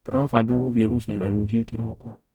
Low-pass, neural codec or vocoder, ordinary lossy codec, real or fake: 19.8 kHz; codec, 44.1 kHz, 0.9 kbps, DAC; none; fake